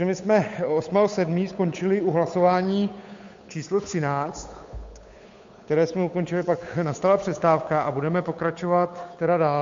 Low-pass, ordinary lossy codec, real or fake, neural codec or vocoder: 7.2 kHz; MP3, 48 kbps; fake; codec, 16 kHz, 8 kbps, FunCodec, trained on Chinese and English, 25 frames a second